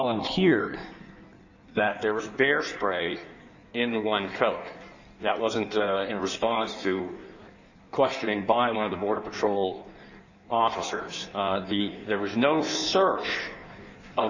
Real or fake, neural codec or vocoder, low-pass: fake; codec, 16 kHz in and 24 kHz out, 1.1 kbps, FireRedTTS-2 codec; 7.2 kHz